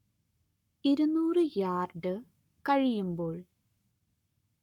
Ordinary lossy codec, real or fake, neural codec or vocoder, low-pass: none; fake; codec, 44.1 kHz, 7.8 kbps, Pupu-Codec; 19.8 kHz